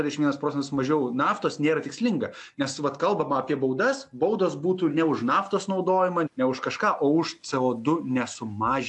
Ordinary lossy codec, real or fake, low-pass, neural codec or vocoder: AAC, 64 kbps; real; 10.8 kHz; none